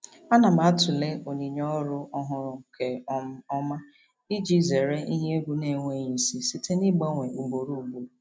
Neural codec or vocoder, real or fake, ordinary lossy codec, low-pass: none; real; none; none